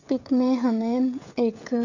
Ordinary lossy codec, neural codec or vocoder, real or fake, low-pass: none; codec, 16 kHz, 16 kbps, FreqCodec, smaller model; fake; 7.2 kHz